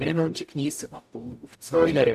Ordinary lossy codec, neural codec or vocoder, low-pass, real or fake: none; codec, 44.1 kHz, 0.9 kbps, DAC; 14.4 kHz; fake